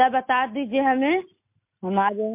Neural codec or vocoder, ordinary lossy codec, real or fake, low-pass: none; MP3, 32 kbps; real; 3.6 kHz